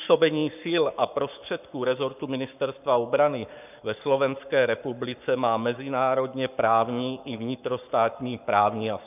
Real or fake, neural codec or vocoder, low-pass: fake; codec, 44.1 kHz, 7.8 kbps, Pupu-Codec; 3.6 kHz